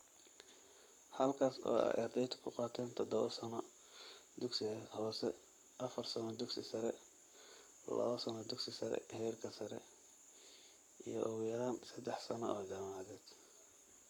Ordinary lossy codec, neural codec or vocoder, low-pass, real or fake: none; codec, 44.1 kHz, 7.8 kbps, Pupu-Codec; 19.8 kHz; fake